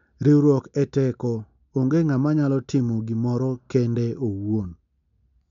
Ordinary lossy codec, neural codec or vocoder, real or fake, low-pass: MP3, 64 kbps; none; real; 7.2 kHz